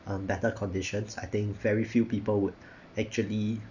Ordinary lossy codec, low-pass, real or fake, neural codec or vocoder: none; 7.2 kHz; real; none